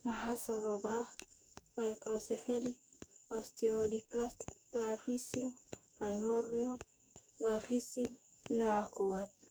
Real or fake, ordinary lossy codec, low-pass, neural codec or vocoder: fake; none; none; codec, 44.1 kHz, 2.6 kbps, DAC